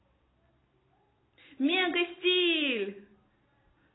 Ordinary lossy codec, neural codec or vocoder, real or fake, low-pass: AAC, 16 kbps; none; real; 7.2 kHz